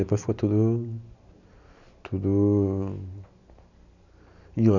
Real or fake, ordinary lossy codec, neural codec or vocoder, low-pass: real; none; none; 7.2 kHz